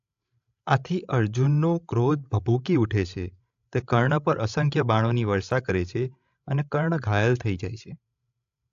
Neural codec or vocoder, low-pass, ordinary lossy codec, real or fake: codec, 16 kHz, 16 kbps, FreqCodec, larger model; 7.2 kHz; AAC, 64 kbps; fake